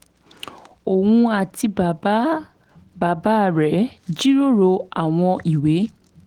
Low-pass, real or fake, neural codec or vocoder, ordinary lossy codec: 19.8 kHz; fake; autoencoder, 48 kHz, 128 numbers a frame, DAC-VAE, trained on Japanese speech; Opus, 16 kbps